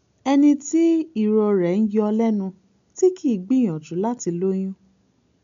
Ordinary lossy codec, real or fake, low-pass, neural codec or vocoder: MP3, 64 kbps; real; 7.2 kHz; none